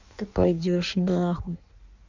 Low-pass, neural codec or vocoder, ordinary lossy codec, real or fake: 7.2 kHz; codec, 16 kHz in and 24 kHz out, 1.1 kbps, FireRedTTS-2 codec; none; fake